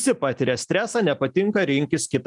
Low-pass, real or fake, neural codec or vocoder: 10.8 kHz; real; none